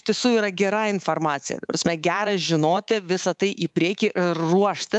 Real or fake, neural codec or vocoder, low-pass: fake; codec, 24 kHz, 3.1 kbps, DualCodec; 10.8 kHz